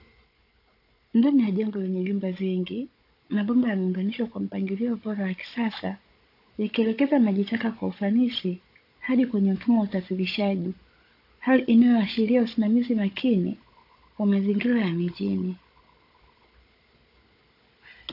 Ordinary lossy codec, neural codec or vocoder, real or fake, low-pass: AAC, 32 kbps; codec, 16 kHz, 16 kbps, FunCodec, trained on Chinese and English, 50 frames a second; fake; 5.4 kHz